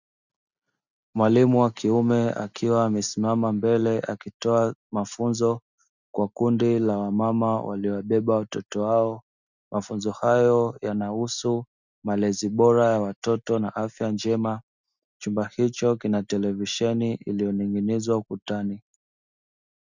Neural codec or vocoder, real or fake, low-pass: none; real; 7.2 kHz